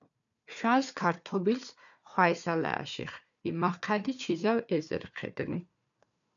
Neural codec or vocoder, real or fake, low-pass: codec, 16 kHz, 4 kbps, FunCodec, trained on Chinese and English, 50 frames a second; fake; 7.2 kHz